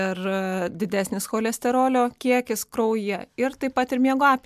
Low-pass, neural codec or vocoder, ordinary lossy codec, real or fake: 14.4 kHz; none; MP3, 96 kbps; real